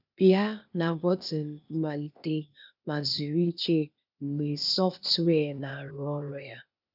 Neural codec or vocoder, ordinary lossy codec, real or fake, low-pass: codec, 16 kHz, 0.8 kbps, ZipCodec; none; fake; 5.4 kHz